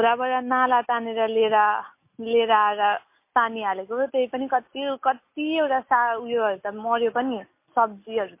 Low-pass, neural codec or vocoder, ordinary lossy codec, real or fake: 3.6 kHz; none; MP3, 24 kbps; real